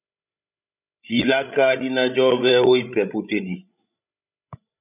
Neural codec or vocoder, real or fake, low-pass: codec, 16 kHz, 16 kbps, FreqCodec, larger model; fake; 3.6 kHz